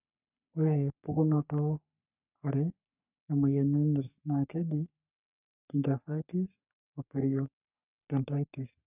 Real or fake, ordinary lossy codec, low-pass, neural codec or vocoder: fake; none; 3.6 kHz; codec, 44.1 kHz, 3.4 kbps, Pupu-Codec